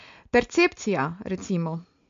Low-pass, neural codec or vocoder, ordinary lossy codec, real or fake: 7.2 kHz; none; MP3, 48 kbps; real